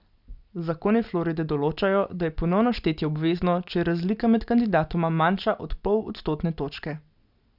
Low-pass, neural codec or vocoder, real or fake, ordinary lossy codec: 5.4 kHz; none; real; none